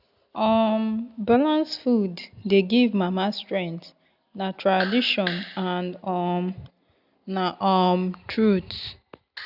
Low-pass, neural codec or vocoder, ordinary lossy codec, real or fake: 5.4 kHz; none; none; real